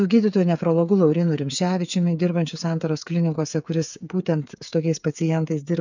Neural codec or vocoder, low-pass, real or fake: codec, 16 kHz, 8 kbps, FreqCodec, smaller model; 7.2 kHz; fake